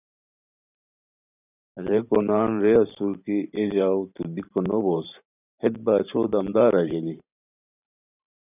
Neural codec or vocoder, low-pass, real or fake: vocoder, 44.1 kHz, 128 mel bands every 512 samples, BigVGAN v2; 3.6 kHz; fake